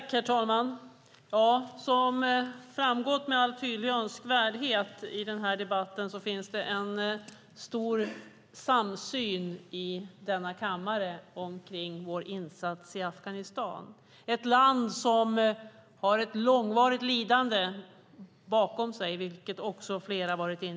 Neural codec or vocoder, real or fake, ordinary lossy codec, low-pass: none; real; none; none